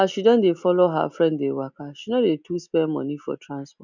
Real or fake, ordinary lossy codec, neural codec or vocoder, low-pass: real; none; none; 7.2 kHz